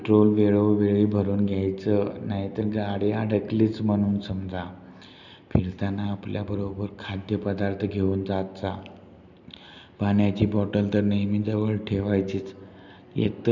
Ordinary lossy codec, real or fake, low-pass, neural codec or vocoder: none; real; 7.2 kHz; none